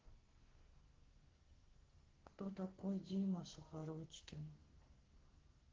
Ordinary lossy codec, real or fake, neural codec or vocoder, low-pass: Opus, 16 kbps; fake; codec, 44.1 kHz, 2.6 kbps, SNAC; 7.2 kHz